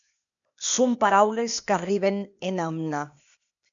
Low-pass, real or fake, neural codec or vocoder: 7.2 kHz; fake; codec, 16 kHz, 0.8 kbps, ZipCodec